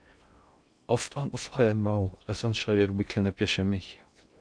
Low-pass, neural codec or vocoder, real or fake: 9.9 kHz; codec, 16 kHz in and 24 kHz out, 0.6 kbps, FocalCodec, streaming, 2048 codes; fake